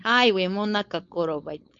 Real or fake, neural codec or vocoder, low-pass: fake; codec, 16 kHz, 4.8 kbps, FACodec; 7.2 kHz